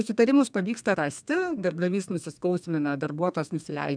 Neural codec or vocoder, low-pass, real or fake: codec, 32 kHz, 1.9 kbps, SNAC; 9.9 kHz; fake